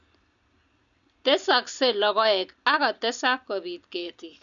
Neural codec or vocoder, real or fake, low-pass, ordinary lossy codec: none; real; 7.2 kHz; none